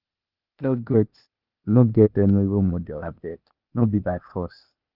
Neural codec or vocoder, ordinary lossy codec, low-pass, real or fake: codec, 16 kHz, 0.8 kbps, ZipCodec; Opus, 24 kbps; 5.4 kHz; fake